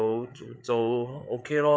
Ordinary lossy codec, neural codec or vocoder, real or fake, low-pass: none; codec, 16 kHz, 8 kbps, FreqCodec, larger model; fake; none